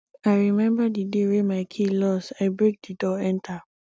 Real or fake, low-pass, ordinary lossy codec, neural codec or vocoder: real; none; none; none